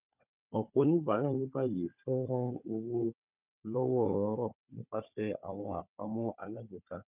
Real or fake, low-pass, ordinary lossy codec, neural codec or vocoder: fake; 3.6 kHz; none; codec, 16 kHz, 4 kbps, FunCodec, trained on Chinese and English, 50 frames a second